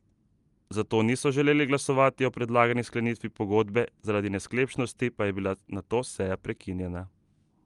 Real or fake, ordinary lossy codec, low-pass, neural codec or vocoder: real; Opus, 32 kbps; 10.8 kHz; none